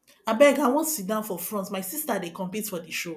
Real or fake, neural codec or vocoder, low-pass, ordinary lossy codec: real; none; 14.4 kHz; none